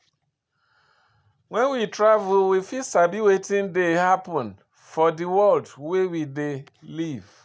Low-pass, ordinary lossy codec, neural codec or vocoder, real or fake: none; none; none; real